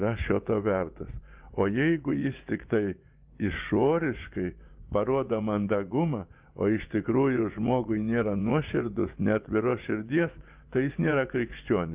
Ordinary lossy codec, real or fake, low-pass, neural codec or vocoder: Opus, 32 kbps; fake; 3.6 kHz; vocoder, 24 kHz, 100 mel bands, Vocos